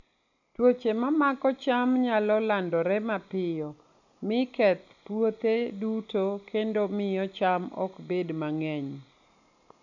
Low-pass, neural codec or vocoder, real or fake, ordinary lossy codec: 7.2 kHz; none; real; none